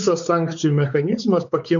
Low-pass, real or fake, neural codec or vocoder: 7.2 kHz; fake; codec, 16 kHz, 4 kbps, X-Codec, WavLM features, trained on Multilingual LibriSpeech